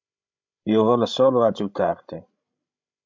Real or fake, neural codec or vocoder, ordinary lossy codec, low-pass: fake; codec, 16 kHz, 16 kbps, FreqCodec, larger model; MP3, 64 kbps; 7.2 kHz